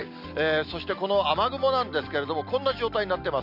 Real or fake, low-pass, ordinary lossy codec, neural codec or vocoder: real; 5.4 kHz; none; none